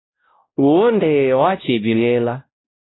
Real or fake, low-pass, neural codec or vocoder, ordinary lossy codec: fake; 7.2 kHz; codec, 16 kHz, 0.5 kbps, X-Codec, WavLM features, trained on Multilingual LibriSpeech; AAC, 16 kbps